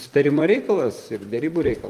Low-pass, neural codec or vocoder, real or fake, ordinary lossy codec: 14.4 kHz; vocoder, 44.1 kHz, 128 mel bands, Pupu-Vocoder; fake; Opus, 32 kbps